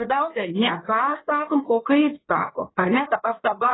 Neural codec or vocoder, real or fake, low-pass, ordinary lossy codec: codec, 16 kHz in and 24 kHz out, 1.1 kbps, FireRedTTS-2 codec; fake; 7.2 kHz; AAC, 16 kbps